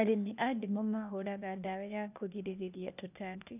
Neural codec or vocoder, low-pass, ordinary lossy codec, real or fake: codec, 16 kHz, 0.8 kbps, ZipCodec; 3.6 kHz; none; fake